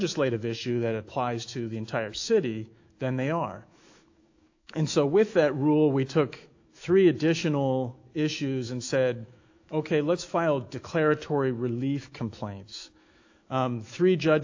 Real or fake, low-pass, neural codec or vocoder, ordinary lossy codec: fake; 7.2 kHz; autoencoder, 48 kHz, 128 numbers a frame, DAC-VAE, trained on Japanese speech; AAC, 48 kbps